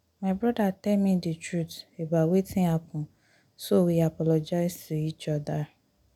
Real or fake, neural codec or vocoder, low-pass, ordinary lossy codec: real; none; 19.8 kHz; none